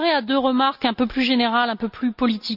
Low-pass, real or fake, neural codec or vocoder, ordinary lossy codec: 5.4 kHz; real; none; none